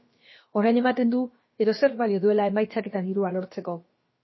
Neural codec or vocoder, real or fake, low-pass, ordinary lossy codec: codec, 16 kHz, about 1 kbps, DyCAST, with the encoder's durations; fake; 7.2 kHz; MP3, 24 kbps